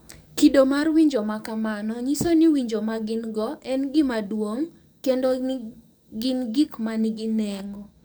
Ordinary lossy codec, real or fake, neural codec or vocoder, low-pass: none; fake; codec, 44.1 kHz, 7.8 kbps, DAC; none